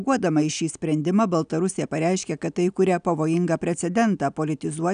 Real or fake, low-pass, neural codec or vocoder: real; 9.9 kHz; none